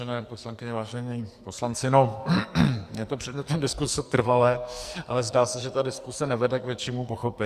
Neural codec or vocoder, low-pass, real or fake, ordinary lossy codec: codec, 44.1 kHz, 2.6 kbps, SNAC; 14.4 kHz; fake; Opus, 64 kbps